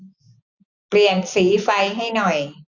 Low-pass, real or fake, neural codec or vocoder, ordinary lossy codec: 7.2 kHz; real; none; none